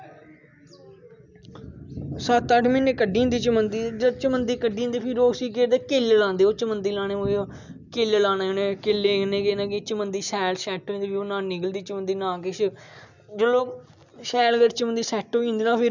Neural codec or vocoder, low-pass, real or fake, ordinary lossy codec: none; 7.2 kHz; real; none